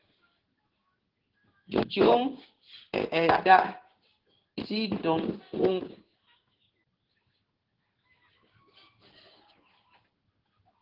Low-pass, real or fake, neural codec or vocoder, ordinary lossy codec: 5.4 kHz; fake; codec, 16 kHz in and 24 kHz out, 1 kbps, XY-Tokenizer; Opus, 16 kbps